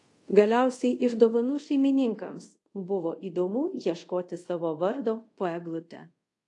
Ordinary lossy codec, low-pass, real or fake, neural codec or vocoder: AAC, 48 kbps; 10.8 kHz; fake; codec, 24 kHz, 0.5 kbps, DualCodec